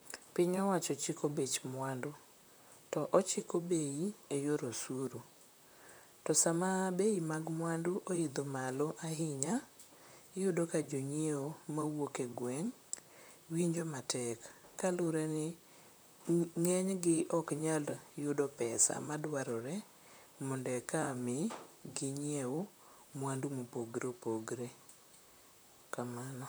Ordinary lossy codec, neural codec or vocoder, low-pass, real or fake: none; vocoder, 44.1 kHz, 128 mel bands, Pupu-Vocoder; none; fake